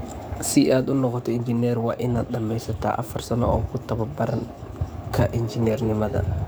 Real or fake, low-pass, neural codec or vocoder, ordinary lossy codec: fake; none; codec, 44.1 kHz, 7.8 kbps, DAC; none